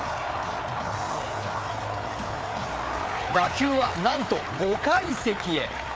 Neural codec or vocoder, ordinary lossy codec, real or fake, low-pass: codec, 16 kHz, 4 kbps, FreqCodec, larger model; none; fake; none